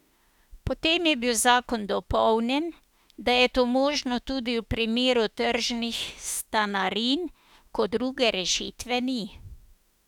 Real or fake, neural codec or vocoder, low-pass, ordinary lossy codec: fake; autoencoder, 48 kHz, 32 numbers a frame, DAC-VAE, trained on Japanese speech; 19.8 kHz; none